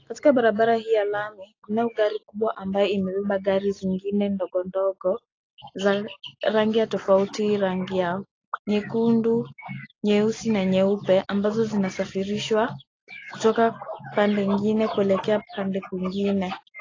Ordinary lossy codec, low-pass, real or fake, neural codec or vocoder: AAC, 32 kbps; 7.2 kHz; real; none